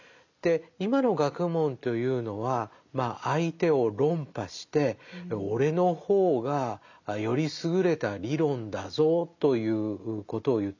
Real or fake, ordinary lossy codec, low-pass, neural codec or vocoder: real; none; 7.2 kHz; none